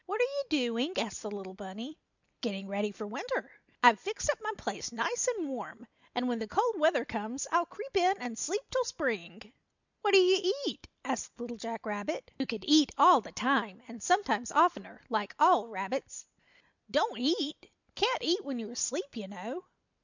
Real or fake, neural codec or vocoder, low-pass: real; none; 7.2 kHz